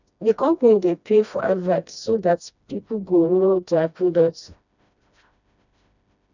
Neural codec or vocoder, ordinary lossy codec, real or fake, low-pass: codec, 16 kHz, 1 kbps, FreqCodec, smaller model; none; fake; 7.2 kHz